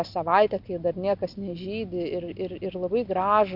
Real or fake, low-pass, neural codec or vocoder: real; 5.4 kHz; none